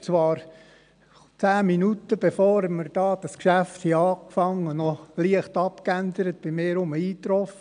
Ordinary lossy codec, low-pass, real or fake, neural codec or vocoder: none; 9.9 kHz; real; none